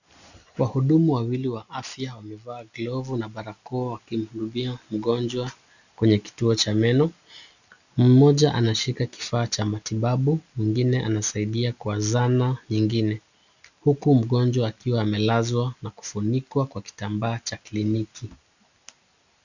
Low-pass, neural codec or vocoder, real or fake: 7.2 kHz; none; real